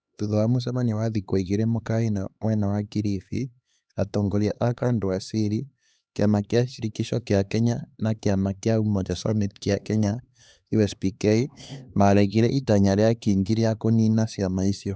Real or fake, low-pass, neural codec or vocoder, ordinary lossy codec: fake; none; codec, 16 kHz, 2 kbps, X-Codec, HuBERT features, trained on LibriSpeech; none